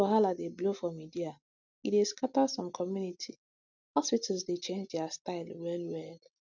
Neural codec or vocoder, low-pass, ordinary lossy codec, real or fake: none; 7.2 kHz; none; real